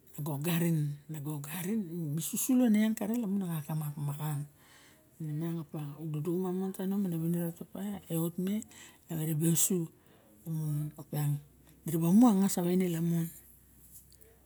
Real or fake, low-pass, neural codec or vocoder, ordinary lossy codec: real; none; none; none